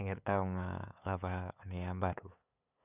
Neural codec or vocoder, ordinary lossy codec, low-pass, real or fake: codec, 16 kHz, 8 kbps, FunCodec, trained on LibriTTS, 25 frames a second; none; 3.6 kHz; fake